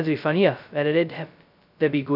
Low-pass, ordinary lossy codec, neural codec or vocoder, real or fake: 5.4 kHz; none; codec, 16 kHz, 0.2 kbps, FocalCodec; fake